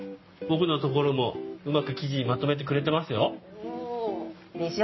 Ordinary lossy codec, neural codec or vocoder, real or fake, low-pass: MP3, 24 kbps; none; real; 7.2 kHz